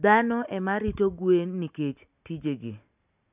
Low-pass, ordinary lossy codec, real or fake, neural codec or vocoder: 3.6 kHz; none; real; none